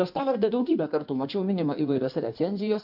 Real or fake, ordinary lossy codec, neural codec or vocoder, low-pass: fake; AAC, 48 kbps; codec, 16 kHz in and 24 kHz out, 1.1 kbps, FireRedTTS-2 codec; 5.4 kHz